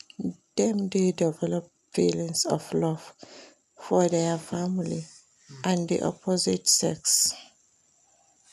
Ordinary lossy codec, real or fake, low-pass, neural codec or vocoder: none; real; 14.4 kHz; none